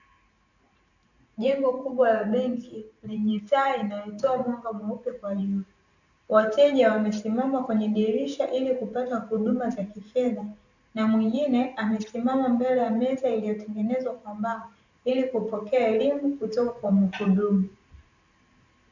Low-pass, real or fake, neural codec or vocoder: 7.2 kHz; fake; vocoder, 44.1 kHz, 128 mel bands every 512 samples, BigVGAN v2